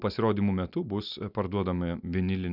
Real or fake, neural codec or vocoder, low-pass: real; none; 5.4 kHz